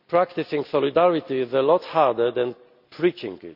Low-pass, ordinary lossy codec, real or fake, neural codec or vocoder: 5.4 kHz; none; real; none